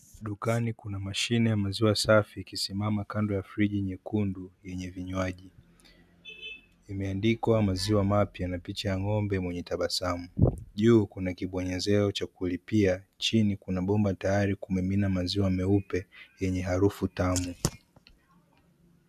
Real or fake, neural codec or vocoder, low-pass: real; none; 14.4 kHz